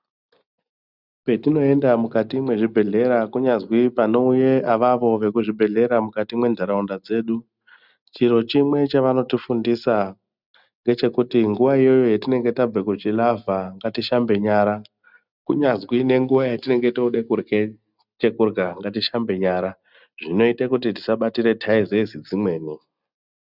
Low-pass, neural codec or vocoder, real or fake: 5.4 kHz; none; real